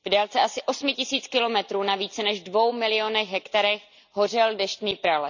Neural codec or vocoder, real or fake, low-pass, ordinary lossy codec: none; real; 7.2 kHz; none